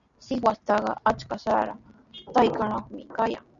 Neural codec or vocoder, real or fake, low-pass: none; real; 7.2 kHz